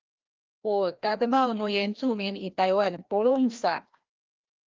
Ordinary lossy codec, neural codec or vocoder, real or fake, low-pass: Opus, 32 kbps; codec, 16 kHz, 1 kbps, FreqCodec, larger model; fake; 7.2 kHz